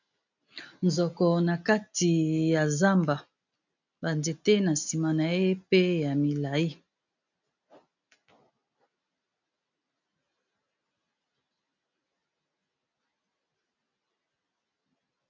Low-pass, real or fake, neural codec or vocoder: 7.2 kHz; real; none